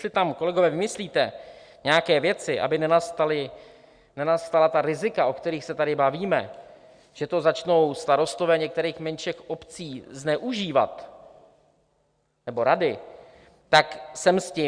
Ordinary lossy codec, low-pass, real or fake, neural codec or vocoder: Opus, 64 kbps; 9.9 kHz; real; none